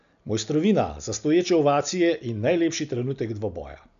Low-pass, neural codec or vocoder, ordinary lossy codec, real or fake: 7.2 kHz; none; MP3, 96 kbps; real